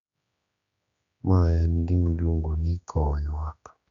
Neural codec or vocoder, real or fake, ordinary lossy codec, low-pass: codec, 16 kHz, 2 kbps, X-Codec, HuBERT features, trained on general audio; fake; Opus, 64 kbps; 7.2 kHz